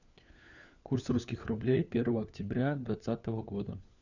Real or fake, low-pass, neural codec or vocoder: fake; 7.2 kHz; codec, 16 kHz, 4 kbps, FunCodec, trained on LibriTTS, 50 frames a second